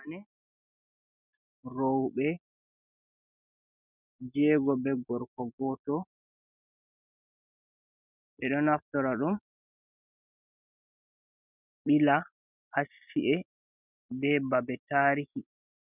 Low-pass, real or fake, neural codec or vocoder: 3.6 kHz; real; none